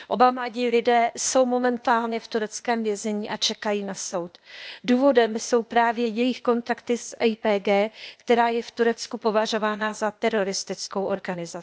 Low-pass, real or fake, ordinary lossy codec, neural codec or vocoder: none; fake; none; codec, 16 kHz, 0.8 kbps, ZipCodec